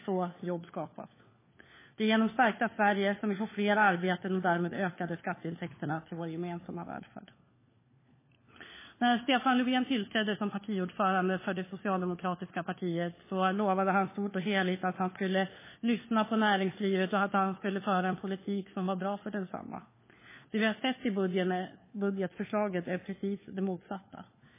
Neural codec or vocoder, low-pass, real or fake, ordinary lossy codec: codec, 16 kHz, 4 kbps, FunCodec, trained on LibriTTS, 50 frames a second; 3.6 kHz; fake; MP3, 16 kbps